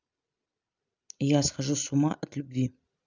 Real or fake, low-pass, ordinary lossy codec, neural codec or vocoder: real; 7.2 kHz; none; none